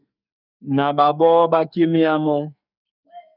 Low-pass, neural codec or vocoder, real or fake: 5.4 kHz; codec, 44.1 kHz, 2.6 kbps, SNAC; fake